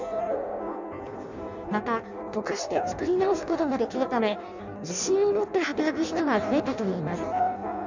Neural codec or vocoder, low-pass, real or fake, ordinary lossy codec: codec, 16 kHz in and 24 kHz out, 0.6 kbps, FireRedTTS-2 codec; 7.2 kHz; fake; none